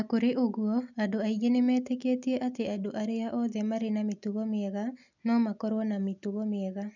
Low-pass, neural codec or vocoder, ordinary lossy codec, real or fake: 7.2 kHz; none; none; real